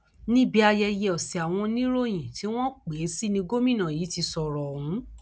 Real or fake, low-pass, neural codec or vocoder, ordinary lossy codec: real; none; none; none